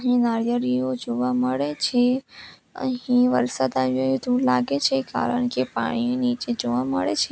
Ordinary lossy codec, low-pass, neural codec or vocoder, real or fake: none; none; none; real